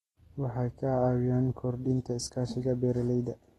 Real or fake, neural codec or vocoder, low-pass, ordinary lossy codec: real; none; 19.8 kHz; AAC, 32 kbps